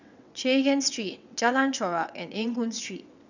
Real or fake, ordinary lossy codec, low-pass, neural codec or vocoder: fake; none; 7.2 kHz; vocoder, 22.05 kHz, 80 mel bands, WaveNeXt